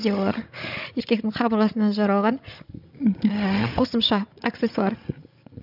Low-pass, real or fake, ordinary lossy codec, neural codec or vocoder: 5.4 kHz; fake; none; codec, 16 kHz, 16 kbps, FreqCodec, larger model